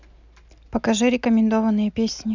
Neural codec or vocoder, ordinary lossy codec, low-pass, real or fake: none; none; 7.2 kHz; real